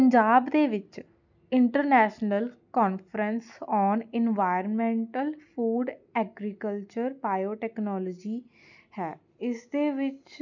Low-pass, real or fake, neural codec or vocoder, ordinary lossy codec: 7.2 kHz; real; none; none